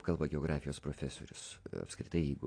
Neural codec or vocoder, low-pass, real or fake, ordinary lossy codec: none; 9.9 kHz; real; AAC, 48 kbps